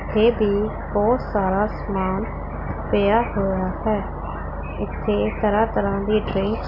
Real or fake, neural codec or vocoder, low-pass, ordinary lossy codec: real; none; 5.4 kHz; none